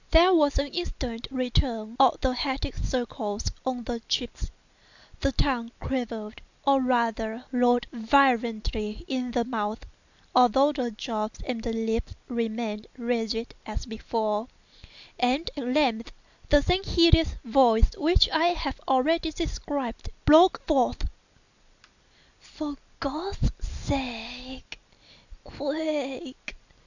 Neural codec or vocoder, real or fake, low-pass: none; real; 7.2 kHz